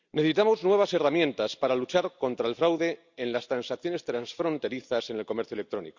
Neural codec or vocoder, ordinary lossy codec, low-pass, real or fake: none; Opus, 64 kbps; 7.2 kHz; real